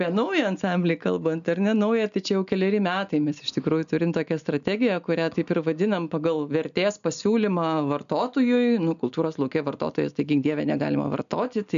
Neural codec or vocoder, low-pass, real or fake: none; 7.2 kHz; real